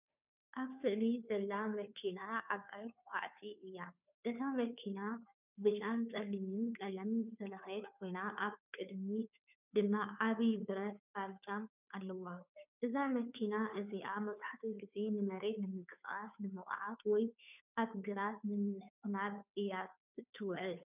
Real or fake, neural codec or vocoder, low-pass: fake; codec, 16 kHz, 2 kbps, FunCodec, trained on Chinese and English, 25 frames a second; 3.6 kHz